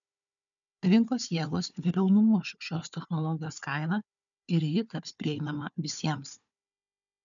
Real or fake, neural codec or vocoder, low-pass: fake; codec, 16 kHz, 4 kbps, FunCodec, trained on Chinese and English, 50 frames a second; 7.2 kHz